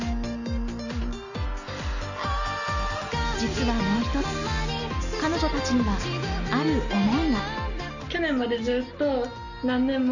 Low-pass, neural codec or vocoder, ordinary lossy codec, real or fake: 7.2 kHz; none; none; real